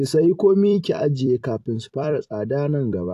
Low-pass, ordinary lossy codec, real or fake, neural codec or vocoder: 14.4 kHz; AAC, 64 kbps; fake; vocoder, 44.1 kHz, 128 mel bands every 256 samples, BigVGAN v2